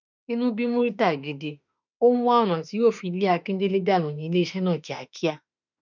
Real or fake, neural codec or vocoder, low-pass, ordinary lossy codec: fake; autoencoder, 48 kHz, 32 numbers a frame, DAC-VAE, trained on Japanese speech; 7.2 kHz; none